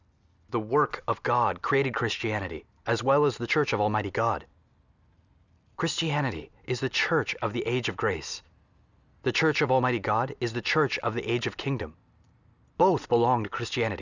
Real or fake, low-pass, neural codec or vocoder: real; 7.2 kHz; none